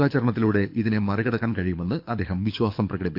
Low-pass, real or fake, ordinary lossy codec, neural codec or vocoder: 5.4 kHz; fake; MP3, 48 kbps; codec, 24 kHz, 6 kbps, HILCodec